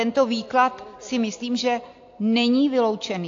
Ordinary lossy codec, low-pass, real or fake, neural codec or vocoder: AAC, 48 kbps; 7.2 kHz; real; none